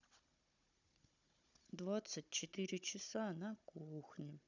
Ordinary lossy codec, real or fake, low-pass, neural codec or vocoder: none; fake; 7.2 kHz; codec, 16 kHz, 16 kbps, FunCodec, trained on Chinese and English, 50 frames a second